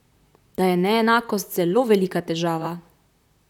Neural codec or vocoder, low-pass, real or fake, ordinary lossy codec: vocoder, 44.1 kHz, 128 mel bands, Pupu-Vocoder; 19.8 kHz; fake; none